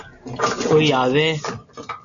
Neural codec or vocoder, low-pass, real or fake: none; 7.2 kHz; real